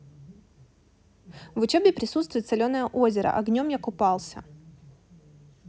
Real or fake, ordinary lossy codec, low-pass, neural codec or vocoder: real; none; none; none